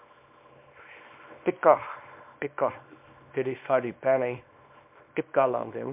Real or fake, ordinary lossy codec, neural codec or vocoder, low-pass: fake; MP3, 32 kbps; codec, 24 kHz, 0.9 kbps, WavTokenizer, small release; 3.6 kHz